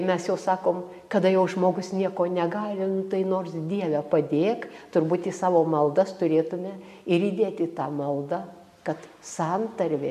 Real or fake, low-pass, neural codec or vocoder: real; 14.4 kHz; none